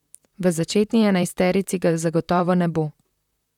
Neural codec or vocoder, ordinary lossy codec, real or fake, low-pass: vocoder, 44.1 kHz, 128 mel bands, Pupu-Vocoder; none; fake; 19.8 kHz